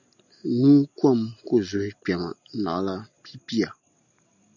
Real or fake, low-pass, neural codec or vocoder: real; 7.2 kHz; none